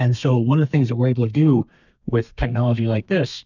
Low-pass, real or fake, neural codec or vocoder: 7.2 kHz; fake; codec, 32 kHz, 1.9 kbps, SNAC